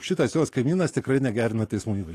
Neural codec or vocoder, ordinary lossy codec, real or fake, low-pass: codec, 44.1 kHz, 7.8 kbps, DAC; AAC, 48 kbps; fake; 14.4 kHz